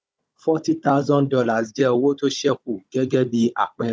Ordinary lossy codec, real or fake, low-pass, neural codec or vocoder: none; fake; none; codec, 16 kHz, 16 kbps, FunCodec, trained on Chinese and English, 50 frames a second